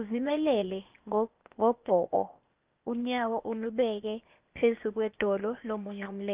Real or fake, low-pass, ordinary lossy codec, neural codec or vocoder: fake; 3.6 kHz; Opus, 24 kbps; codec, 16 kHz, 0.8 kbps, ZipCodec